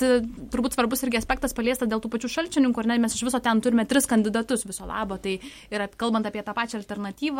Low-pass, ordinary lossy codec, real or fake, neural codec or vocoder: 19.8 kHz; MP3, 64 kbps; real; none